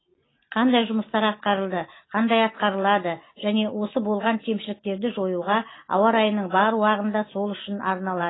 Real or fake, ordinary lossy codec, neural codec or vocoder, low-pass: real; AAC, 16 kbps; none; 7.2 kHz